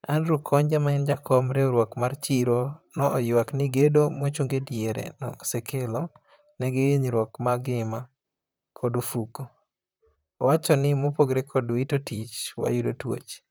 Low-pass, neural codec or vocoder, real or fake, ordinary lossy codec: none; vocoder, 44.1 kHz, 128 mel bands, Pupu-Vocoder; fake; none